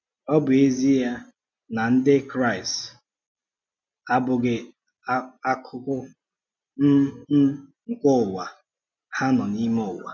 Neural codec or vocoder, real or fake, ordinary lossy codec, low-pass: none; real; none; 7.2 kHz